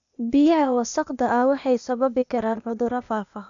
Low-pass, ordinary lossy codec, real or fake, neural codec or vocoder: 7.2 kHz; none; fake; codec, 16 kHz, 0.8 kbps, ZipCodec